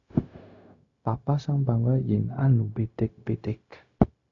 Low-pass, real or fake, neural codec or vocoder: 7.2 kHz; fake; codec, 16 kHz, 0.4 kbps, LongCat-Audio-Codec